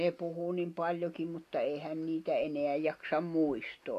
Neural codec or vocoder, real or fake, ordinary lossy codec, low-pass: none; real; AAC, 64 kbps; 14.4 kHz